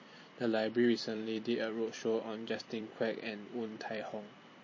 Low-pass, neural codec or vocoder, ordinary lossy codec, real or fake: 7.2 kHz; vocoder, 44.1 kHz, 128 mel bands every 256 samples, BigVGAN v2; MP3, 32 kbps; fake